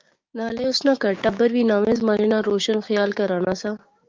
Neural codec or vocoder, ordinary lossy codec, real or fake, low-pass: codec, 16 kHz, 6 kbps, DAC; Opus, 24 kbps; fake; 7.2 kHz